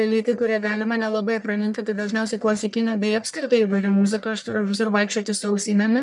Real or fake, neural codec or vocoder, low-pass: fake; codec, 44.1 kHz, 1.7 kbps, Pupu-Codec; 10.8 kHz